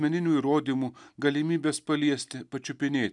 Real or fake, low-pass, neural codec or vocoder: real; 10.8 kHz; none